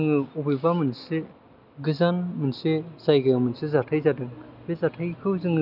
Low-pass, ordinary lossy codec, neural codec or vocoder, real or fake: 5.4 kHz; none; none; real